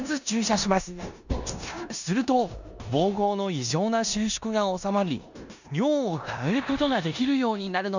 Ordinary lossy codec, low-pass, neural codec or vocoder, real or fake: none; 7.2 kHz; codec, 16 kHz in and 24 kHz out, 0.9 kbps, LongCat-Audio-Codec, four codebook decoder; fake